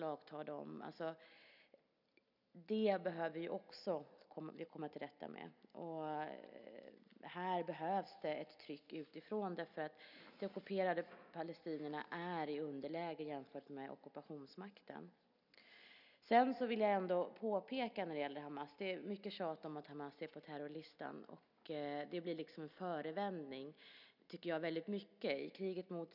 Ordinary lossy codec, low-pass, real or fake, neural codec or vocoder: none; 5.4 kHz; real; none